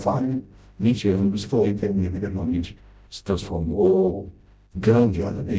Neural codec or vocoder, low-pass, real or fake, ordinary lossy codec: codec, 16 kHz, 0.5 kbps, FreqCodec, smaller model; none; fake; none